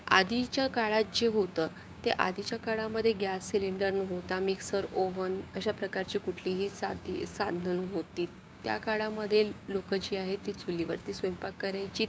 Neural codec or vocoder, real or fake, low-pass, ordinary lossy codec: codec, 16 kHz, 6 kbps, DAC; fake; none; none